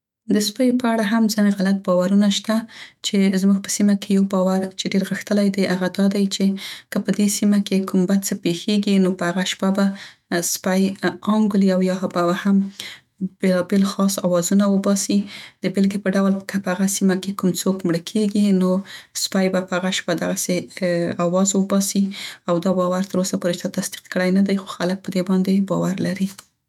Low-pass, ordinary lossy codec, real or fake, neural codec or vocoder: 19.8 kHz; none; fake; autoencoder, 48 kHz, 128 numbers a frame, DAC-VAE, trained on Japanese speech